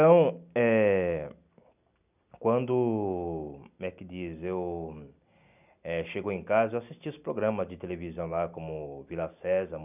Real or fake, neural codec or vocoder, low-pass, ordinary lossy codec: real; none; 3.6 kHz; none